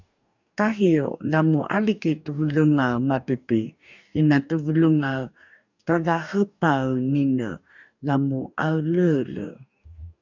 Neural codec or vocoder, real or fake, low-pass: codec, 44.1 kHz, 2.6 kbps, DAC; fake; 7.2 kHz